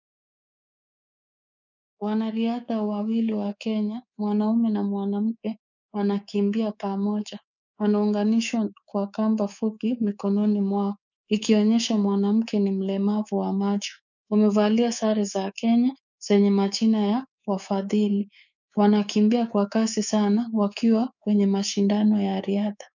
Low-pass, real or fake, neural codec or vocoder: 7.2 kHz; fake; codec, 24 kHz, 3.1 kbps, DualCodec